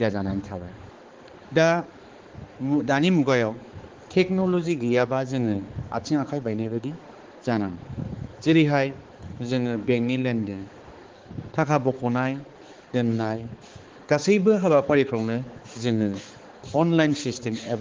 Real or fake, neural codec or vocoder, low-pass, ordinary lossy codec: fake; codec, 16 kHz, 4 kbps, X-Codec, HuBERT features, trained on balanced general audio; 7.2 kHz; Opus, 16 kbps